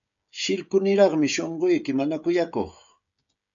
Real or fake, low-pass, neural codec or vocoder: fake; 7.2 kHz; codec, 16 kHz, 16 kbps, FreqCodec, smaller model